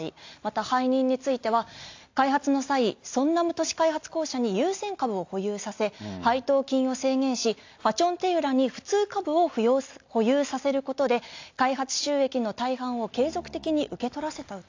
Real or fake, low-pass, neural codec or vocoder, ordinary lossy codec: real; 7.2 kHz; none; AAC, 48 kbps